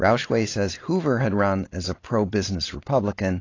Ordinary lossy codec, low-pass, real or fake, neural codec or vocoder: AAC, 32 kbps; 7.2 kHz; real; none